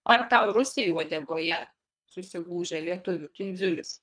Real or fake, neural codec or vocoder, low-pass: fake; codec, 24 kHz, 1.5 kbps, HILCodec; 9.9 kHz